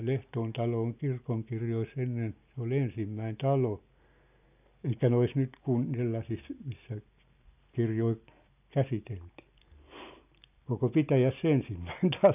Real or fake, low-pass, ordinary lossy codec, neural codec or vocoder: real; 3.6 kHz; none; none